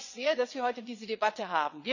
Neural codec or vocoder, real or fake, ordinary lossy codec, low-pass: vocoder, 22.05 kHz, 80 mel bands, WaveNeXt; fake; none; 7.2 kHz